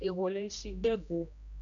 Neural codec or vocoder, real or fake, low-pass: codec, 16 kHz, 1 kbps, X-Codec, HuBERT features, trained on general audio; fake; 7.2 kHz